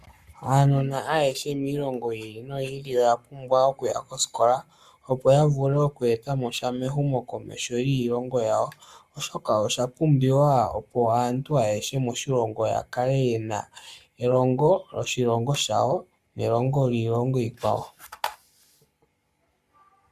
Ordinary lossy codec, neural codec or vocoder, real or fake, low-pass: Opus, 64 kbps; codec, 44.1 kHz, 7.8 kbps, Pupu-Codec; fake; 14.4 kHz